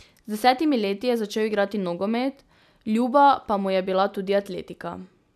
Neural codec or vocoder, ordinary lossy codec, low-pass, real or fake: none; none; 14.4 kHz; real